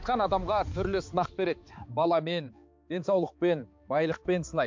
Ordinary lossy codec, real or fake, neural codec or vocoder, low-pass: MP3, 48 kbps; fake; codec, 16 kHz, 4 kbps, X-Codec, HuBERT features, trained on balanced general audio; 7.2 kHz